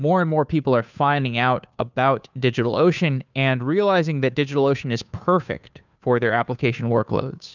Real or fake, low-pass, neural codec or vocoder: fake; 7.2 kHz; codec, 16 kHz, 2 kbps, FunCodec, trained on Chinese and English, 25 frames a second